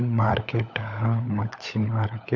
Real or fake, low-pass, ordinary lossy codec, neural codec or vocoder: fake; 7.2 kHz; none; codec, 16 kHz, 16 kbps, FunCodec, trained on LibriTTS, 50 frames a second